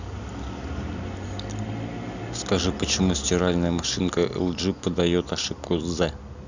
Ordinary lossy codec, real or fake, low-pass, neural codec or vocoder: none; real; 7.2 kHz; none